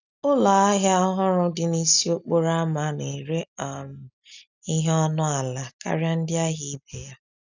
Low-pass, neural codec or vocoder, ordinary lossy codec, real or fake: 7.2 kHz; none; none; real